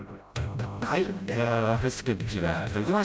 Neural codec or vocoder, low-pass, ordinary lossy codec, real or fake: codec, 16 kHz, 0.5 kbps, FreqCodec, smaller model; none; none; fake